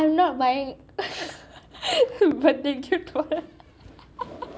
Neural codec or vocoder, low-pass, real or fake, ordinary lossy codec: none; none; real; none